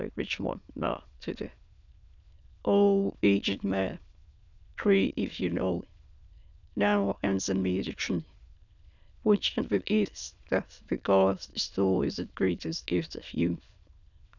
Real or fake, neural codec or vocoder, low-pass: fake; autoencoder, 22.05 kHz, a latent of 192 numbers a frame, VITS, trained on many speakers; 7.2 kHz